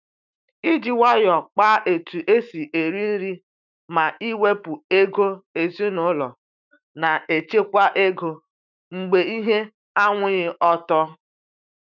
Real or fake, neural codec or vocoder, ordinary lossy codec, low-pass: fake; autoencoder, 48 kHz, 128 numbers a frame, DAC-VAE, trained on Japanese speech; none; 7.2 kHz